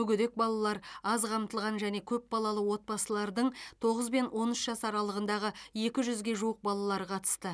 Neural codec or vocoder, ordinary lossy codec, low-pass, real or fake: none; none; none; real